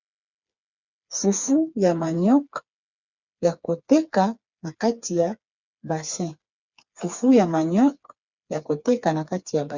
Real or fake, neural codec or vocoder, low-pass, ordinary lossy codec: fake; codec, 16 kHz, 4 kbps, FreqCodec, smaller model; 7.2 kHz; Opus, 64 kbps